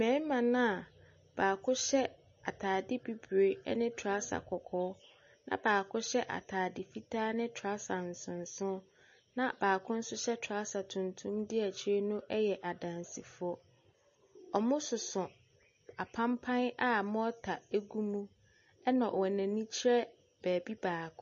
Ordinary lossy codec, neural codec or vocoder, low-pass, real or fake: MP3, 32 kbps; none; 7.2 kHz; real